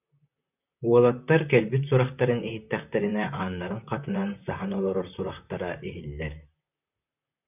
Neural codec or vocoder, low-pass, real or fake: vocoder, 44.1 kHz, 128 mel bands, Pupu-Vocoder; 3.6 kHz; fake